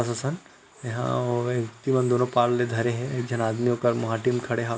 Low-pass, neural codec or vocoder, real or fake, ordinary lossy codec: none; none; real; none